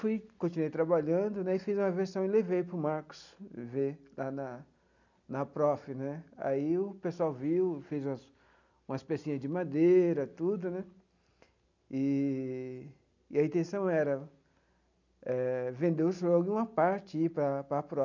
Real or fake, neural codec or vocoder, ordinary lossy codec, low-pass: real; none; none; 7.2 kHz